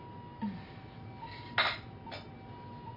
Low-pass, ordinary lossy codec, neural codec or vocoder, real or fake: 5.4 kHz; none; none; real